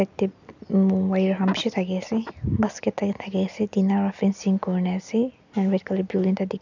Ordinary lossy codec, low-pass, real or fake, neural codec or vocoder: none; 7.2 kHz; real; none